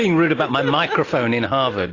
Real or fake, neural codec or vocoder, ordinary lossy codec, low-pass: real; none; AAC, 32 kbps; 7.2 kHz